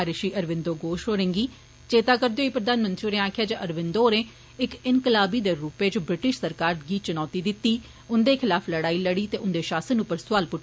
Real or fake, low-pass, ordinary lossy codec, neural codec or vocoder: real; none; none; none